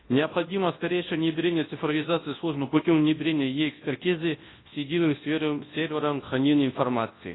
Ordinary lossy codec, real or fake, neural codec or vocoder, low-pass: AAC, 16 kbps; fake; codec, 24 kHz, 0.9 kbps, WavTokenizer, large speech release; 7.2 kHz